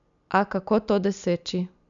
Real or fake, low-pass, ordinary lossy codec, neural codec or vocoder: real; 7.2 kHz; MP3, 96 kbps; none